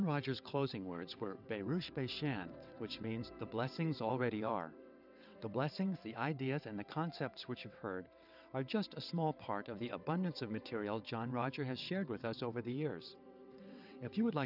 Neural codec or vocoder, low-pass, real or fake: vocoder, 22.05 kHz, 80 mel bands, WaveNeXt; 5.4 kHz; fake